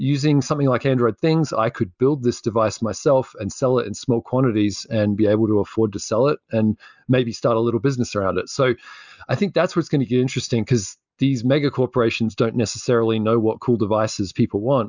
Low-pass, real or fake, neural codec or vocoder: 7.2 kHz; real; none